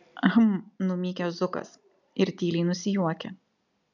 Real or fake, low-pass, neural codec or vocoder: real; 7.2 kHz; none